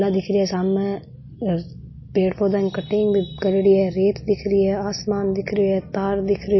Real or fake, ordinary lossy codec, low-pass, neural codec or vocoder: real; MP3, 24 kbps; 7.2 kHz; none